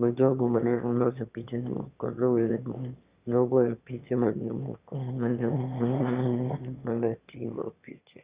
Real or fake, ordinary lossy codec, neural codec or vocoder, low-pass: fake; Opus, 64 kbps; autoencoder, 22.05 kHz, a latent of 192 numbers a frame, VITS, trained on one speaker; 3.6 kHz